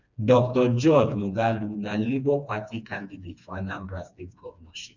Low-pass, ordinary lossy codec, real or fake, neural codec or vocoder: 7.2 kHz; none; fake; codec, 16 kHz, 2 kbps, FreqCodec, smaller model